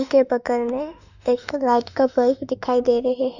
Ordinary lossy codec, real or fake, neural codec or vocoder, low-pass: none; fake; autoencoder, 48 kHz, 32 numbers a frame, DAC-VAE, trained on Japanese speech; 7.2 kHz